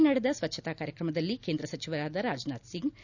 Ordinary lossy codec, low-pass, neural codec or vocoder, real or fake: none; 7.2 kHz; none; real